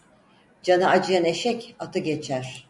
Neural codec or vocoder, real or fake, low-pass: none; real; 10.8 kHz